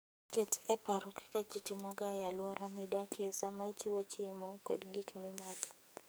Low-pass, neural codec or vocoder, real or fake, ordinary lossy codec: none; codec, 44.1 kHz, 2.6 kbps, SNAC; fake; none